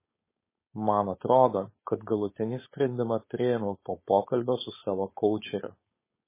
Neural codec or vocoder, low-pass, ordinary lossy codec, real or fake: codec, 16 kHz, 4.8 kbps, FACodec; 3.6 kHz; MP3, 16 kbps; fake